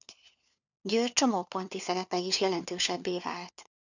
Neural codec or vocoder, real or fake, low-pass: codec, 16 kHz, 4 kbps, FunCodec, trained on LibriTTS, 50 frames a second; fake; 7.2 kHz